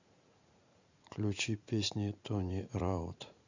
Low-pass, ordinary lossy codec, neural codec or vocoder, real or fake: 7.2 kHz; Opus, 64 kbps; vocoder, 44.1 kHz, 80 mel bands, Vocos; fake